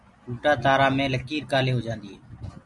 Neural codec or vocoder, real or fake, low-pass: none; real; 10.8 kHz